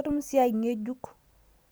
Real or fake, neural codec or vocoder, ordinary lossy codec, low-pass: real; none; none; none